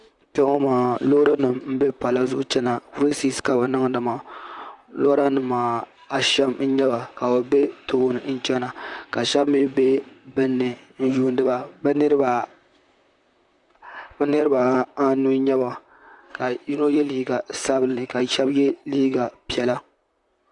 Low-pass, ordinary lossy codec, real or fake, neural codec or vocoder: 10.8 kHz; Opus, 64 kbps; fake; vocoder, 44.1 kHz, 128 mel bands, Pupu-Vocoder